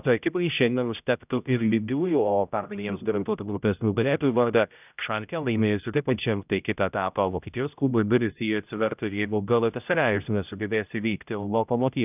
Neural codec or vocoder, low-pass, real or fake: codec, 16 kHz, 0.5 kbps, X-Codec, HuBERT features, trained on general audio; 3.6 kHz; fake